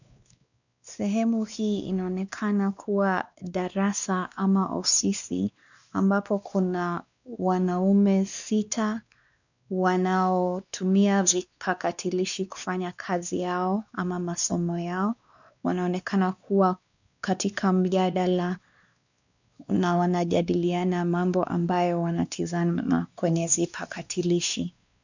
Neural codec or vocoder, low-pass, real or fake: codec, 16 kHz, 2 kbps, X-Codec, WavLM features, trained on Multilingual LibriSpeech; 7.2 kHz; fake